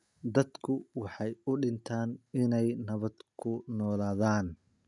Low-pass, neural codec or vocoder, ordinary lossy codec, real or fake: 10.8 kHz; none; none; real